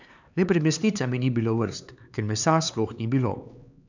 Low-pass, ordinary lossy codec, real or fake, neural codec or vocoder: 7.2 kHz; none; fake; codec, 16 kHz, 4 kbps, X-Codec, HuBERT features, trained on LibriSpeech